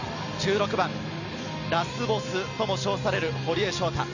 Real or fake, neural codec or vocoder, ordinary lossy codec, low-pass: real; none; none; 7.2 kHz